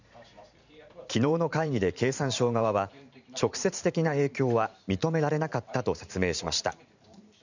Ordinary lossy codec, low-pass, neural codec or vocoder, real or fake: none; 7.2 kHz; vocoder, 44.1 kHz, 128 mel bands every 512 samples, BigVGAN v2; fake